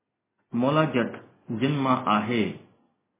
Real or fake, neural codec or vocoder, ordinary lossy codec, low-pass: real; none; MP3, 16 kbps; 3.6 kHz